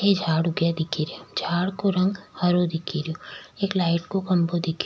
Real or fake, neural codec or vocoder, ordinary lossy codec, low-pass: real; none; none; none